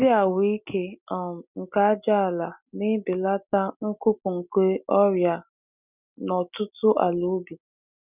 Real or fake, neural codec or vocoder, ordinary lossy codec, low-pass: real; none; none; 3.6 kHz